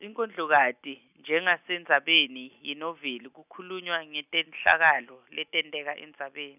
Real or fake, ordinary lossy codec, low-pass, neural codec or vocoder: real; none; 3.6 kHz; none